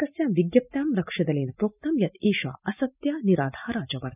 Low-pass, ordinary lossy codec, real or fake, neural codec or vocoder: 3.6 kHz; none; real; none